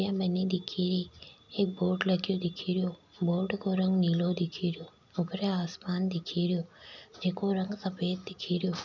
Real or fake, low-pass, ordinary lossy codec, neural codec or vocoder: real; 7.2 kHz; none; none